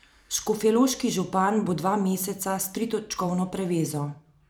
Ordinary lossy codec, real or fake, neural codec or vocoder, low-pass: none; fake; vocoder, 44.1 kHz, 128 mel bands every 512 samples, BigVGAN v2; none